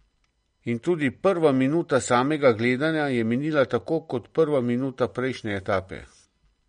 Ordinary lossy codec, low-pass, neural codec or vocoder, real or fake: MP3, 48 kbps; 9.9 kHz; none; real